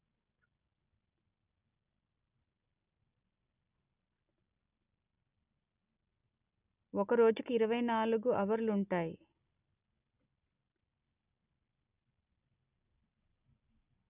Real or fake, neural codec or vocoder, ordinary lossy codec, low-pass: real; none; none; 3.6 kHz